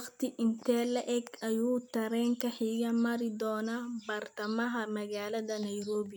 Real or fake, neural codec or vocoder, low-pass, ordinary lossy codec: real; none; none; none